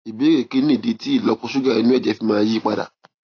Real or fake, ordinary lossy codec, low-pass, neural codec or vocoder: real; AAC, 32 kbps; 7.2 kHz; none